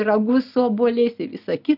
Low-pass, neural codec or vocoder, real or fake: 5.4 kHz; none; real